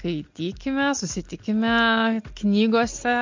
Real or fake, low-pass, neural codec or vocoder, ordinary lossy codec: real; 7.2 kHz; none; MP3, 48 kbps